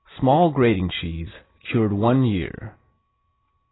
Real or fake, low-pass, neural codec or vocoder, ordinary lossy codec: real; 7.2 kHz; none; AAC, 16 kbps